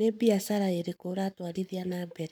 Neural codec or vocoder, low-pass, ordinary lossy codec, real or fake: codec, 44.1 kHz, 7.8 kbps, Pupu-Codec; none; none; fake